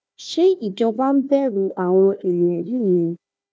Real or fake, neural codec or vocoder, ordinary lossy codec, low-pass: fake; codec, 16 kHz, 1 kbps, FunCodec, trained on Chinese and English, 50 frames a second; none; none